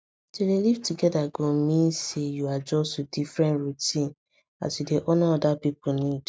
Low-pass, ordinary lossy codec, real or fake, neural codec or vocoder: none; none; real; none